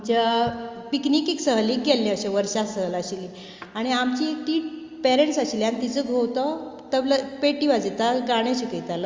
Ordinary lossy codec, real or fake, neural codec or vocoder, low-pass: Opus, 32 kbps; real; none; 7.2 kHz